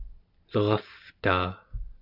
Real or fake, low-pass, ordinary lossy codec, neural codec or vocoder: real; 5.4 kHz; AAC, 48 kbps; none